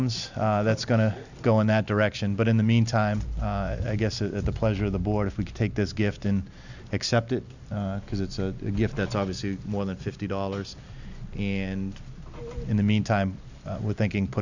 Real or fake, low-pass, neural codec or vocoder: real; 7.2 kHz; none